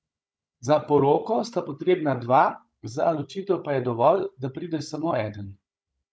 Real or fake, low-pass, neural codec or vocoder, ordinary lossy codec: fake; none; codec, 16 kHz, 16 kbps, FunCodec, trained on Chinese and English, 50 frames a second; none